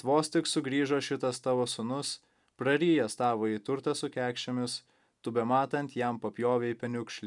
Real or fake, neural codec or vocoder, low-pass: real; none; 10.8 kHz